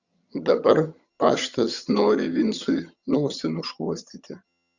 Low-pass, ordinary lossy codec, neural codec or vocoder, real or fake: 7.2 kHz; Opus, 64 kbps; vocoder, 22.05 kHz, 80 mel bands, HiFi-GAN; fake